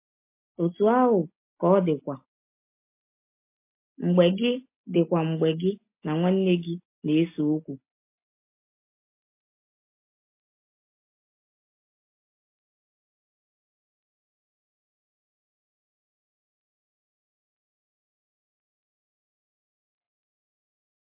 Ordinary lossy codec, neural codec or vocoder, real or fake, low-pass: MP3, 24 kbps; none; real; 3.6 kHz